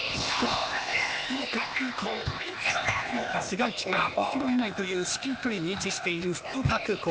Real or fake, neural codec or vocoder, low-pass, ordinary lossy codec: fake; codec, 16 kHz, 0.8 kbps, ZipCodec; none; none